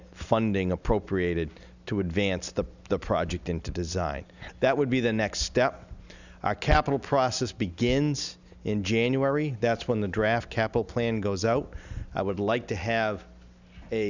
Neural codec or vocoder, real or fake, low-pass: none; real; 7.2 kHz